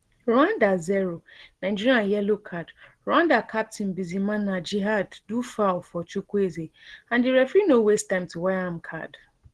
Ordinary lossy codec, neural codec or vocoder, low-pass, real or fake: Opus, 16 kbps; none; 10.8 kHz; real